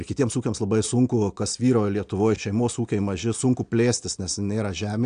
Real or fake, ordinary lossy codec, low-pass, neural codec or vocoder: real; AAC, 64 kbps; 9.9 kHz; none